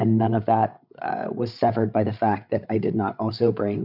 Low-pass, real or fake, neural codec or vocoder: 5.4 kHz; fake; codec, 16 kHz, 8 kbps, FreqCodec, larger model